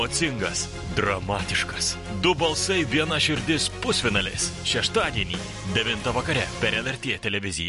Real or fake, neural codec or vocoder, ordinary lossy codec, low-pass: real; none; MP3, 48 kbps; 14.4 kHz